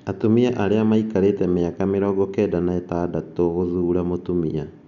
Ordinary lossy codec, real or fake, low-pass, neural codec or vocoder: none; real; 7.2 kHz; none